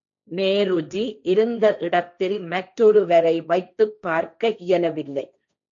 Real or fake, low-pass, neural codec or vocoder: fake; 7.2 kHz; codec, 16 kHz, 1.1 kbps, Voila-Tokenizer